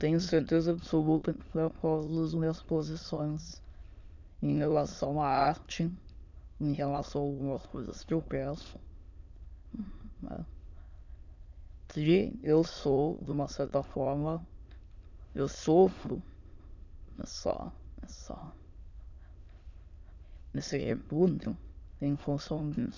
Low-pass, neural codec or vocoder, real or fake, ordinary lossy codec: 7.2 kHz; autoencoder, 22.05 kHz, a latent of 192 numbers a frame, VITS, trained on many speakers; fake; none